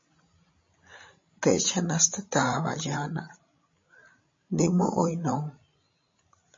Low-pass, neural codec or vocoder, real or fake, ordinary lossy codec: 7.2 kHz; codec, 16 kHz, 16 kbps, FreqCodec, larger model; fake; MP3, 32 kbps